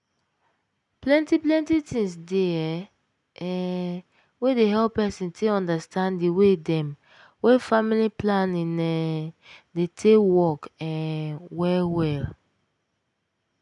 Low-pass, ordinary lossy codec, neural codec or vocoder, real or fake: 10.8 kHz; none; none; real